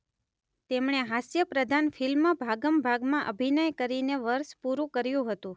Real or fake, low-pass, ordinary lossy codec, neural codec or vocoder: real; none; none; none